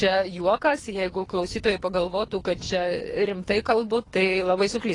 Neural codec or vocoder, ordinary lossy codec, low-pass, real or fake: codec, 24 kHz, 3 kbps, HILCodec; AAC, 32 kbps; 10.8 kHz; fake